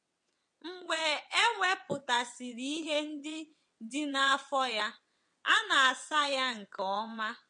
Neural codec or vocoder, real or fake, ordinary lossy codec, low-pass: vocoder, 22.05 kHz, 80 mel bands, WaveNeXt; fake; MP3, 48 kbps; 9.9 kHz